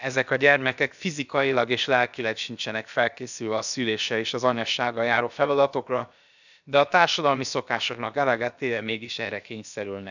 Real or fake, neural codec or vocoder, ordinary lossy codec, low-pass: fake; codec, 16 kHz, about 1 kbps, DyCAST, with the encoder's durations; none; 7.2 kHz